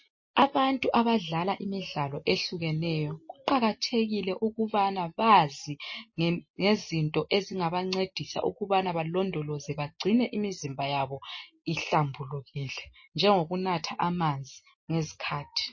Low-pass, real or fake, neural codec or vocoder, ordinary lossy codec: 7.2 kHz; real; none; MP3, 32 kbps